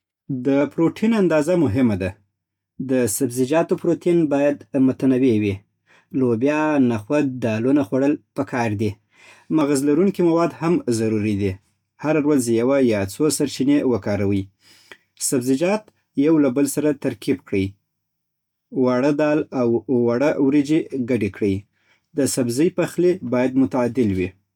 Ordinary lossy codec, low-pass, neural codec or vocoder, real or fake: none; 19.8 kHz; none; real